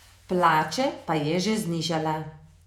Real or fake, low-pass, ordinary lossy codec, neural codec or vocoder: fake; 19.8 kHz; none; vocoder, 48 kHz, 128 mel bands, Vocos